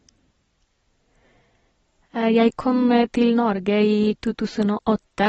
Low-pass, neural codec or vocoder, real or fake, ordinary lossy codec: 19.8 kHz; none; real; AAC, 24 kbps